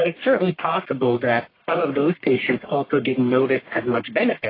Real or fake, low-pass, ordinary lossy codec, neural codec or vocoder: fake; 5.4 kHz; AAC, 24 kbps; codec, 44.1 kHz, 1.7 kbps, Pupu-Codec